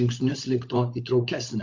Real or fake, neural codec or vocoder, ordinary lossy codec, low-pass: fake; codec, 16 kHz, 16 kbps, FunCodec, trained on LibriTTS, 50 frames a second; MP3, 48 kbps; 7.2 kHz